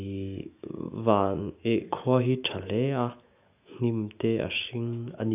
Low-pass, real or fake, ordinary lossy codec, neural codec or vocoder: 3.6 kHz; fake; AAC, 32 kbps; autoencoder, 48 kHz, 128 numbers a frame, DAC-VAE, trained on Japanese speech